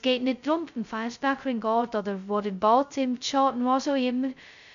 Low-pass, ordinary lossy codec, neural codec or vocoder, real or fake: 7.2 kHz; none; codec, 16 kHz, 0.2 kbps, FocalCodec; fake